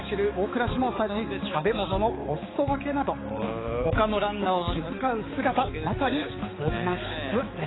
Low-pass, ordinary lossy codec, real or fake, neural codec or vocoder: 7.2 kHz; AAC, 16 kbps; fake; codec, 16 kHz, 4 kbps, X-Codec, HuBERT features, trained on balanced general audio